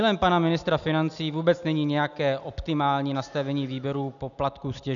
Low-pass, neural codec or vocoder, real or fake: 7.2 kHz; none; real